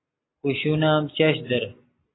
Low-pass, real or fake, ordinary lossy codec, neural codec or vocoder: 7.2 kHz; real; AAC, 16 kbps; none